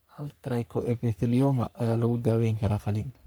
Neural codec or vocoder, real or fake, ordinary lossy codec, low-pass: codec, 44.1 kHz, 3.4 kbps, Pupu-Codec; fake; none; none